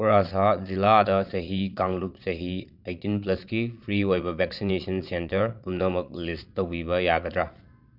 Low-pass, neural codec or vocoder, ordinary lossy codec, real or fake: 5.4 kHz; vocoder, 22.05 kHz, 80 mel bands, Vocos; none; fake